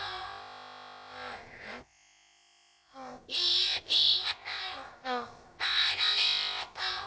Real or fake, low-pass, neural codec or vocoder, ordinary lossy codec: fake; none; codec, 16 kHz, about 1 kbps, DyCAST, with the encoder's durations; none